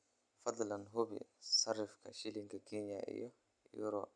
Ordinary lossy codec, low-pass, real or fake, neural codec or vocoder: none; 9.9 kHz; real; none